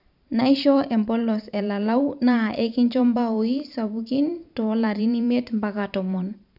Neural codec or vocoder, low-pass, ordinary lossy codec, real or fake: vocoder, 44.1 kHz, 128 mel bands every 512 samples, BigVGAN v2; 5.4 kHz; none; fake